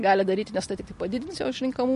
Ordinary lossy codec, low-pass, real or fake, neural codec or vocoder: MP3, 48 kbps; 14.4 kHz; real; none